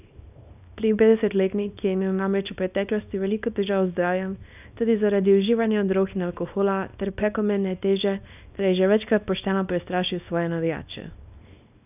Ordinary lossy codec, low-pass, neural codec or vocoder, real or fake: none; 3.6 kHz; codec, 24 kHz, 0.9 kbps, WavTokenizer, small release; fake